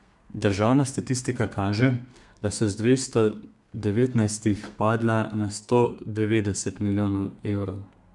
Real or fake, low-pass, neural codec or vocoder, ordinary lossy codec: fake; 10.8 kHz; codec, 32 kHz, 1.9 kbps, SNAC; MP3, 96 kbps